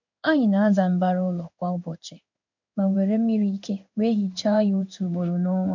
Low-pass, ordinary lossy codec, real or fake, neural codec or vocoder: 7.2 kHz; none; fake; codec, 16 kHz in and 24 kHz out, 1 kbps, XY-Tokenizer